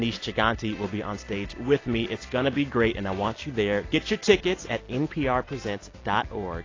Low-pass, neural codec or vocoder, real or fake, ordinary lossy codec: 7.2 kHz; none; real; AAC, 32 kbps